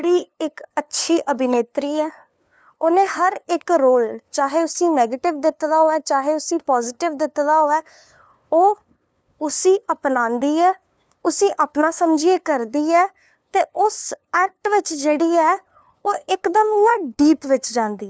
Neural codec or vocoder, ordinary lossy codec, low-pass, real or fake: codec, 16 kHz, 2 kbps, FunCodec, trained on LibriTTS, 25 frames a second; none; none; fake